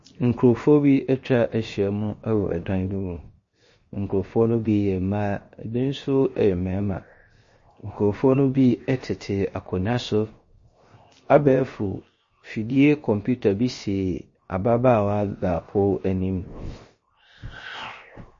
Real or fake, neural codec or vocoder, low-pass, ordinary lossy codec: fake; codec, 16 kHz, 0.7 kbps, FocalCodec; 7.2 kHz; MP3, 32 kbps